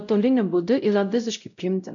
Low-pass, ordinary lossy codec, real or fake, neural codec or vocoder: 7.2 kHz; MP3, 96 kbps; fake; codec, 16 kHz, 0.5 kbps, X-Codec, WavLM features, trained on Multilingual LibriSpeech